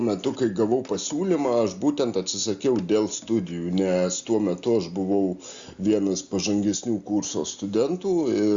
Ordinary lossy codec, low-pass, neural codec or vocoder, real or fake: Opus, 64 kbps; 7.2 kHz; none; real